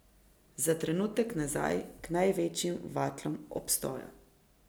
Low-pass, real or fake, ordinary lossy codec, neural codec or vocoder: none; real; none; none